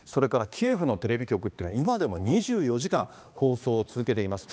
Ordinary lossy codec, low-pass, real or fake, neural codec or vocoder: none; none; fake; codec, 16 kHz, 2 kbps, X-Codec, HuBERT features, trained on balanced general audio